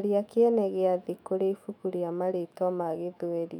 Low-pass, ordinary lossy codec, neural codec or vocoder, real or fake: 19.8 kHz; none; autoencoder, 48 kHz, 128 numbers a frame, DAC-VAE, trained on Japanese speech; fake